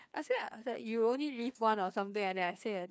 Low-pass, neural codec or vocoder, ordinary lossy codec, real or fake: none; codec, 16 kHz, 2 kbps, FreqCodec, larger model; none; fake